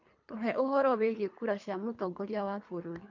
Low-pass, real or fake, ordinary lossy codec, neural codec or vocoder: 7.2 kHz; fake; MP3, 48 kbps; codec, 24 kHz, 3 kbps, HILCodec